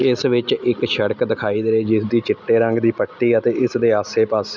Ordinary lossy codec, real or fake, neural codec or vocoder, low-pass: none; real; none; 7.2 kHz